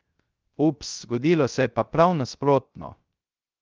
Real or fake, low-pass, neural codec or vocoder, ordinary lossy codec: fake; 7.2 kHz; codec, 16 kHz, 0.3 kbps, FocalCodec; Opus, 24 kbps